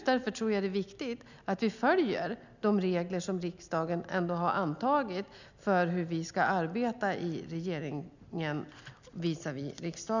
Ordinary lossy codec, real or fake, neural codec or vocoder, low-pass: none; real; none; 7.2 kHz